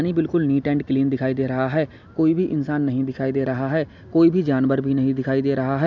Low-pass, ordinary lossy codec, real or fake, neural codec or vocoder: 7.2 kHz; none; real; none